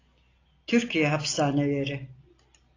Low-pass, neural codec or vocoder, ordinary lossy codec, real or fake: 7.2 kHz; none; AAC, 32 kbps; real